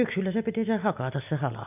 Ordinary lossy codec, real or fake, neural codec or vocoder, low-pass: none; real; none; 3.6 kHz